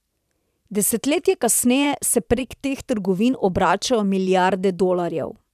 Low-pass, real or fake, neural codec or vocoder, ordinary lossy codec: 14.4 kHz; fake; vocoder, 44.1 kHz, 128 mel bands every 512 samples, BigVGAN v2; none